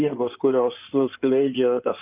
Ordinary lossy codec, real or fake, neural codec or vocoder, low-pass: Opus, 24 kbps; fake; codec, 16 kHz in and 24 kHz out, 2.2 kbps, FireRedTTS-2 codec; 3.6 kHz